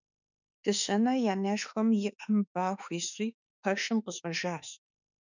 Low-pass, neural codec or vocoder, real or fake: 7.2 kHz; autoencoder, 48 kHz, 32 numbers a frame, DAC-VAE, trained on Japanese speech; fake